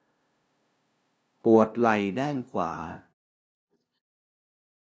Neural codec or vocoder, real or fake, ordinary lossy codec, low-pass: codec, 16 kHz, 0.5 kbps, FunCodec, trained on LibriTTS, 25 frames a second; fake; none; none